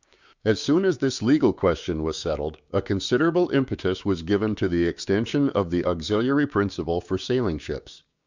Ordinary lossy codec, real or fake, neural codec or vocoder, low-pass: Opus, 64 kbps; fake; codec, 16 kHz, 6 kbps, DAC; 7.2 kHz